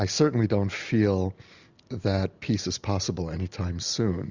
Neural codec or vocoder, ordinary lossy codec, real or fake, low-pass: none; Opus, 64 kbps; real; 7.2 kHz